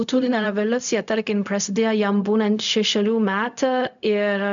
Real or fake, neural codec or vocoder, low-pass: fake; codec, 16 kHz, 0.4 kbps, LongCat-Audio-Codec; 7.2 kHz